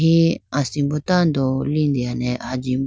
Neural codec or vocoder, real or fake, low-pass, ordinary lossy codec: none; real; none; none